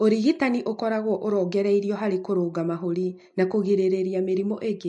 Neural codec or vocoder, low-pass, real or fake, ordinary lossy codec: none; 10.8 kHz; real; MP3, 48 kbps